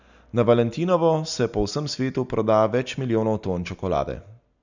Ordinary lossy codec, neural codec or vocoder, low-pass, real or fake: none; none; 7.2 kHz; real